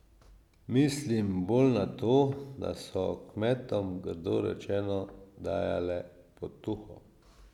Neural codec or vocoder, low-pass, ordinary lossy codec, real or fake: none; 19.8 kHz; none; real